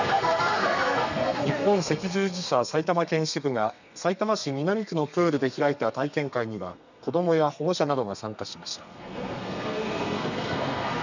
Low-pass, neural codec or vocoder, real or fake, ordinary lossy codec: 7.2 kHz; codec, 32 kHz, 1.9 kbps, SNAC; fake; none